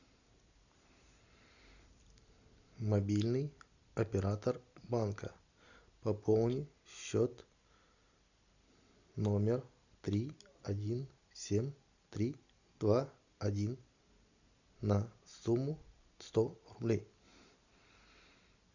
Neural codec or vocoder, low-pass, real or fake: none; 7.2 kHz; real